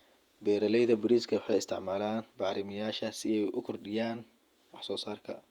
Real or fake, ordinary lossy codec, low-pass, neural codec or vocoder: fake; Opus, 64 kbps; 19.8 kHz; vocoder, 48 kHz, 128 mel bands, Vocos